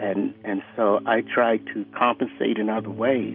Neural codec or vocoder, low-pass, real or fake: none; 5.4 kHz; real